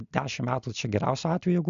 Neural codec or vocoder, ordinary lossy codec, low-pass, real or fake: none; AAC, 96 kbps; 7.2 kHz; real